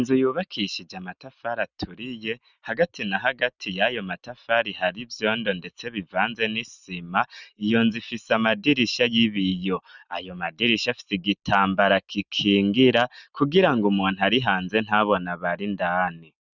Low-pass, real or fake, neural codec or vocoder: 7.2 kHz; real; none